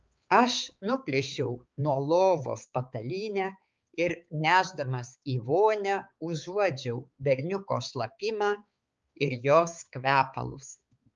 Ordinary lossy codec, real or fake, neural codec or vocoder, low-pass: Opus, 24 kbps; fake; codec, 16 kHz, 4 kbps, X-Codec, HuBERT features, trained on balanced general audio; 7.2 kHz